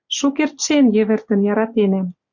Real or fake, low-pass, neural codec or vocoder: real; 7.2 kHz; none